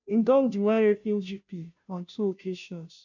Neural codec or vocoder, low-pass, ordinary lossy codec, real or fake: codec, 16 kHz, 0.5 kbps, FunCodec, trained on Chinese and English, 25 frames a second; 7.2 kHz; none; fake